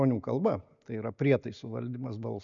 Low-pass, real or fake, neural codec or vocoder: 7.2 kHz; real; none